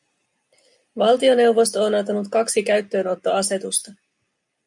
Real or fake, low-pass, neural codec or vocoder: real; 10.8 kHz; none